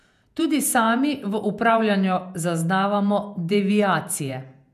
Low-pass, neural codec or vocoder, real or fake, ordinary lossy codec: 14.4 kHz; vocoder, 48 kHz, 128 mel bands, Vocos; fake; none